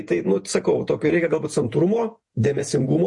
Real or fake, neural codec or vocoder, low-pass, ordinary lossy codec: fake; vocoder, 48 kHz, 128 mel bands, Vocos; 10.8 kHz; MP3, 48 kbps